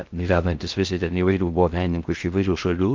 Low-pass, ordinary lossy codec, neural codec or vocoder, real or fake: 7.2 kHz; Opus, 24 kbps; codec, 16 kHz in and 24 kHz out, 0.6 kbps, FocalCodec, streaming, 2048 codes; fake